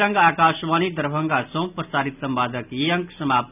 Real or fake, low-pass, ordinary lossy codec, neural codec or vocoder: real; 3.6 kHz; none; none